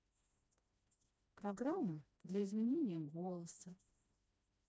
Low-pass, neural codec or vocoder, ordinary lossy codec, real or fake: none; codec, 16 kHz, 1 kbps, FreqCodec, smaller model; none; fake